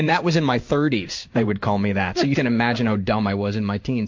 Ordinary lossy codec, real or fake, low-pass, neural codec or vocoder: MP3, 48 kbps; fake; 7.2 kHz; codec, 16 kHz in and 24 kHz out, 1 kbps, XY-Tokenizer